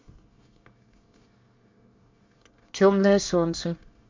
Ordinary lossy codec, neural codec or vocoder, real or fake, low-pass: MP3, 64 kbps; codec, 24 kHz, 1 kbps, SNAC; fake; 7.2 kHz